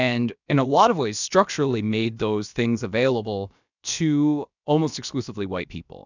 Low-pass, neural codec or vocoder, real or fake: 7.2 kHz; codec, 16 kHz, about 1 kbps, DyCAST, with the encoder's durations; fake